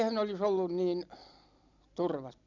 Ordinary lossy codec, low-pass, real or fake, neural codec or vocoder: none; 7.2 kHz; real; none